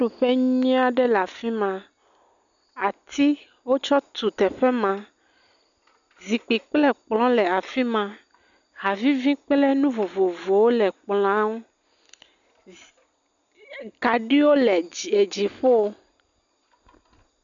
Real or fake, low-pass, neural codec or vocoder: real; 7.2 kHz; none